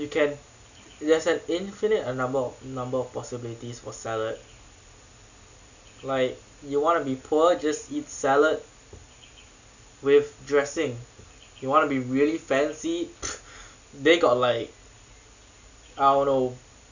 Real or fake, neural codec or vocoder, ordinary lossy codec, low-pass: real; none; none; 7.2 kHz